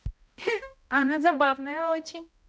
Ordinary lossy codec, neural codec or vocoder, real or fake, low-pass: none; codec, 16 kHz, 0.5 kbps, X-Codec, HuBERT features, trained on balanced general audio; fake; none